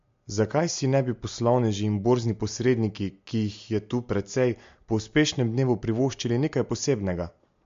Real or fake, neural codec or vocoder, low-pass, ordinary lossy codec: real; none; 7.2 kHz; MP3, 48 kbps